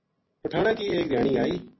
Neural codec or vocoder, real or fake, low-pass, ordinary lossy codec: none; real; 7.2 kHz; MP3, 24 kbps